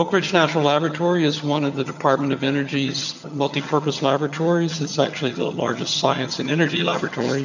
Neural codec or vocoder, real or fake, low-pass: vocoder, 22.05 kHz, 80 mel bands, HiFi-GAN; fake; 7.2 kHz